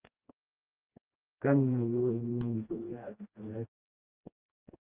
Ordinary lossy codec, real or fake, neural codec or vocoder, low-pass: Opus, 24 kbps; fake; codec, 16 kHz, 1 kbps, FreqCodec, smaller model; 3.6 kHz